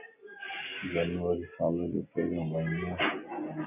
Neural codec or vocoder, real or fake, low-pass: none; real; 3.6 kHz